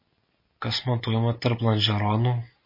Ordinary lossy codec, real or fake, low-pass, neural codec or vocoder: MP3, 24 kbps; real; 5.4 kHz; none